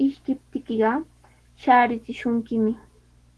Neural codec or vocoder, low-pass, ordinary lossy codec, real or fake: autoencoder, 48 kHz, 128 numbers a frame, DAC-VAE, trained on Japanese speech; 10.8 kHz; Opus, 16 kbps; fake